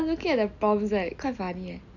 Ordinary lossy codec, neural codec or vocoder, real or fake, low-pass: none; none; real; 7.2 kHz